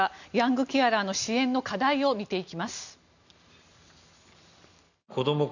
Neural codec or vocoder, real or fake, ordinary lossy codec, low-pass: none; real; none; 7.2 kHz